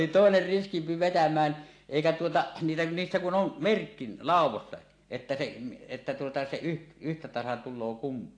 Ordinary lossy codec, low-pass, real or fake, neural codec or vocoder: AAC, 48 kbps; 9.9 kHz; real; none